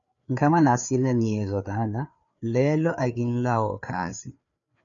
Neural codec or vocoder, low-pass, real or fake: codec, 16 kHz, 4 kbps, FreqCodec, larger model; 7.2 kHz; fake